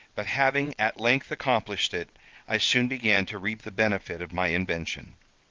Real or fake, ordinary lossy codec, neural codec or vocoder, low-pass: fake; Opus, 32 kbps; vocoder, 22.05 kHz, 80 mel bands, WaveNeXt; 7.2 kHz